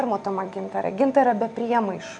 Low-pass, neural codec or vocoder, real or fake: 9.9 kHz; none; real